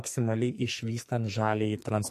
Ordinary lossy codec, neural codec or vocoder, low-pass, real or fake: MP3, 64 kbps; codec, 44.1 kHz, 2.6 kbps, SNAC; 14.4 kHz; fake